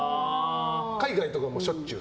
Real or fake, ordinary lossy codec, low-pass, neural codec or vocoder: real; none; none; none